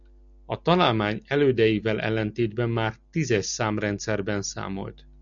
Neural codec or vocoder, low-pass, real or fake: none; 7.2 kHz; real